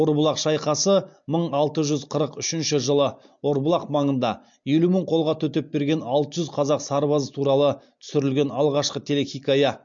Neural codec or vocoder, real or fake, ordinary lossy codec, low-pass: none; real; MP3, 48 kbps; 7.2 kHz